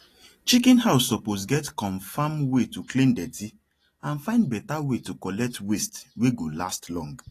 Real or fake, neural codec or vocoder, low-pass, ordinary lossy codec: real; none; 14.4 kHz; AAC, 48 kbps